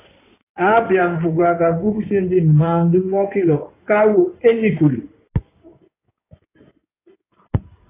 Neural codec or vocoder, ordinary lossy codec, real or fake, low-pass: codec, 16 kHz in and 24 kHz out, 2.2 kbps, FireRedTTS-2 codec; AAC, 24 kbps; fake; 3.6 kHz